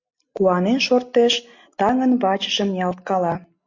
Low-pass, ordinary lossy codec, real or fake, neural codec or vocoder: 7.2 kHz; MP3, 48 kbps; real; none